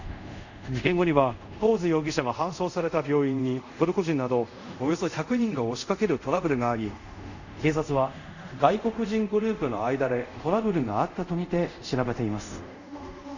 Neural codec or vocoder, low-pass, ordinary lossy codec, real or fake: codec, 24 kHz, 0.5 kbps, DualCodec; 7.2 kHz; none; fake